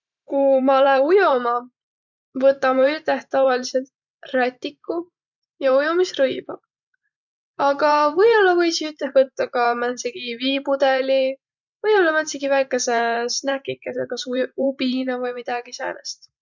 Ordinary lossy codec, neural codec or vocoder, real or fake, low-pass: none; vocoder, 44.1 kHz, 128 mel bands every 512 samples, BigVGAN v2; fake; 7.2 kHz